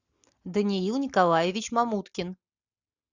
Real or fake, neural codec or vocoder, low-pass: real; none; 7.2 kHz